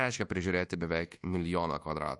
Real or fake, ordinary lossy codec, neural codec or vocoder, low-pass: fake; MP3, 48 kbps; codec, 24 kHz, 1.2 kbps, DualCodec; 10.8 kHz